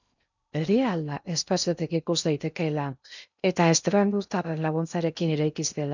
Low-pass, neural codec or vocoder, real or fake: 7.2 kHz; codec, 16 kHz in and 24 kHz out, 0.6 kbps, FocalCodec, streaming, 2048 codes; fake